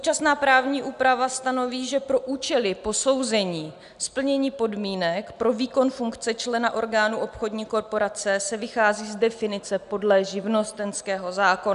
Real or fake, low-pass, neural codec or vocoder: real; 10.8 kHz; none